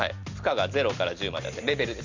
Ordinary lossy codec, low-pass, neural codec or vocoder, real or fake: Opus, 64 kbps; 7.2 kHz; none; real